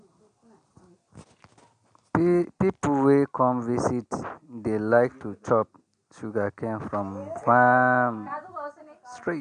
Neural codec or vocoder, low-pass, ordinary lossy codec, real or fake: none; 9.9 kHz; none; real